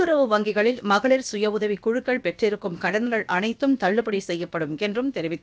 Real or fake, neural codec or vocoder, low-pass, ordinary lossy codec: fake; codec, 16 kHz, about 1 kbps, DyCAST, with the encoder's durations; none; none